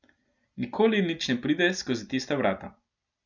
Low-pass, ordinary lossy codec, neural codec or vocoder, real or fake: 7.2 kHz; none; none; real